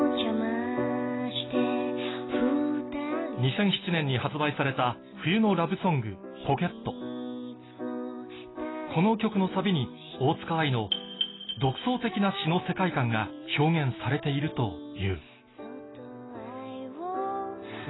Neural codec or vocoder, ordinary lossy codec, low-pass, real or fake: none; AAC, 16 kbps; 7.2 kHz; real